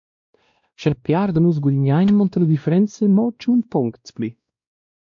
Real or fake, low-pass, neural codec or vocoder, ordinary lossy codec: fake; 7.2 kHz; codec, 16 kHz, 1 kbps, X-Codec, WavLM features, trained on Multilingual LibriSpeech; MP3, 48 kbps